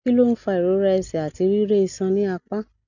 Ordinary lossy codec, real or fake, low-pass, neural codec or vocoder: none; real; 7.2 kHz; none